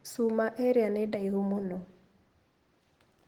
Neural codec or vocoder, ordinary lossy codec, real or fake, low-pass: none; Opus, 16 kbps; real; 19.8 kHz